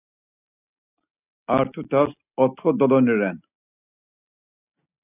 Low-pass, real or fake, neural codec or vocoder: 3.6 kHz; real; none